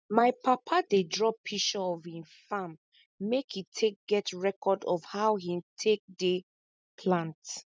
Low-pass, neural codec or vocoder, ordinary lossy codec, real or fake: none; none; none; real